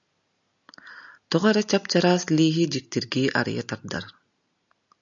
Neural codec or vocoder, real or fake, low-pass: none; real; 7.2 kHz